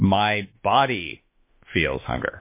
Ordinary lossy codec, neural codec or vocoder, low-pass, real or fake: MP3, 24 kbps; autoencoder, 48 kHz, 32 numbers a frame, DAC-VAE, trained on Japanese speech; 3.6 kHz; fake